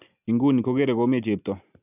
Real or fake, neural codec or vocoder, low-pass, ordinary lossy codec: real; none; 3.6 kHz; none